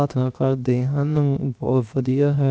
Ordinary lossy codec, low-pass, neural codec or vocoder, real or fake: none; none; codec, 16 kHz, 0.7 kbps, FocalCodec; fake